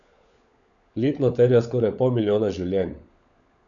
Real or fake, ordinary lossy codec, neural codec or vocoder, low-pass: fake; none; codec, 16 kHz, 16 kbps, FunCodec, trained on LibriTTS, 50 frames a second; 7.2 kHz